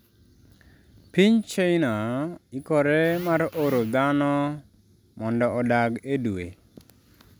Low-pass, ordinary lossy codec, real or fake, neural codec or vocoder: none; none; real; none